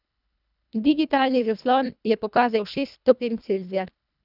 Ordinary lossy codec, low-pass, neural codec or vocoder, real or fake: none; 5.4 kHz; codec, 24 kHz, 1.5 kbps, HILCodec; fake